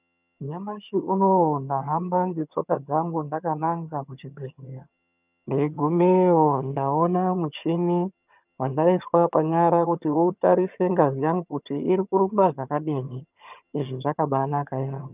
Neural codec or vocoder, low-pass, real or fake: vocoder, 22.05 kHz, 80 mel bands, HiFi-GAN; 3.6 kHz; fake